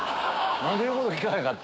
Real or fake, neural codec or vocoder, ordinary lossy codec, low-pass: fake; codec, 16 kHz, 6 kbps, DAC; none; none